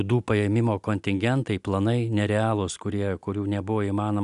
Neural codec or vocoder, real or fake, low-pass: none; real; 10.8 kHz